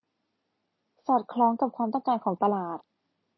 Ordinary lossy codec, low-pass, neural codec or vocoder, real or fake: MP3, 24 kbps; 7.2 kHz; none; real